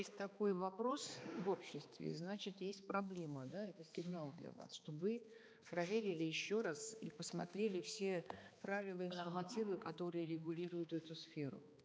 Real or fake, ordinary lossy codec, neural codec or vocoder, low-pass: fake; none; codec, 16 kHz, 2 kbps, X-Codec, HuBERT features, trained on balanced general audio; none